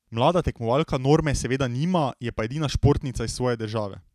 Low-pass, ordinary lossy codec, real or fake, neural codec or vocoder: 14.4 kHz; none; real; none